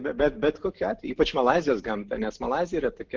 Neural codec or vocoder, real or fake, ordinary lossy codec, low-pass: none; real; Opus, 16 kbps; 7.2 kHz